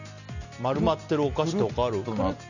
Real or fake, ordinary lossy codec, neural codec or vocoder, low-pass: real; none; none; 7.2 kHz